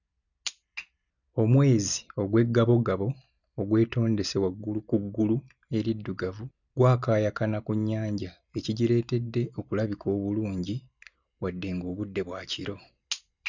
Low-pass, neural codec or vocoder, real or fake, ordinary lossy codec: 7.2 kHz; none; real; none